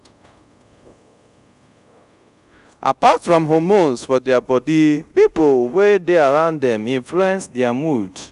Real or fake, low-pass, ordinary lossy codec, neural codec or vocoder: fake; 10.8 kHz; none; codec, 24 kHz, 0.5 kbps, DualCodec